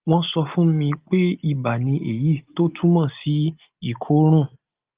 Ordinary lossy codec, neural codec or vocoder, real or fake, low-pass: Opus, 32 kbps; none; real; 3.6 kHz